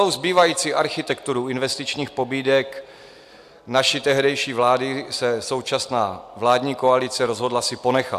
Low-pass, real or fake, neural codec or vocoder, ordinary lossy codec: 14.4 kHz; real; none; AAC, 96 kbps